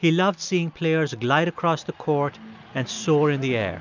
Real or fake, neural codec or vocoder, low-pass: real; none; 7.2 kHz